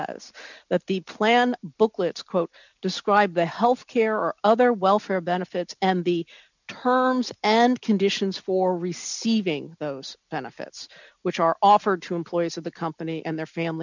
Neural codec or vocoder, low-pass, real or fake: none; 7.2 kHz; real